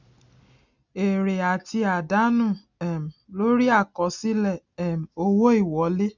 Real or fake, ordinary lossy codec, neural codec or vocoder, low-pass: real; none; none; 7.2 kHz